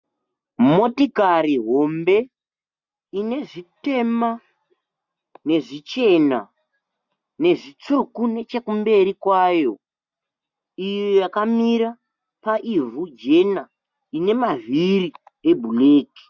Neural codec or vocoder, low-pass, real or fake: none; 7.2 kHz; real